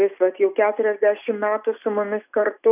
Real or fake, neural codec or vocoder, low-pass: real; none; 3.6 kHz